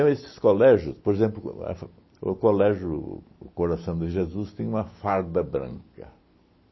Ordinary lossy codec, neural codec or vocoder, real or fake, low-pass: MP3, 24 kbps; none; real; 7.2 kHz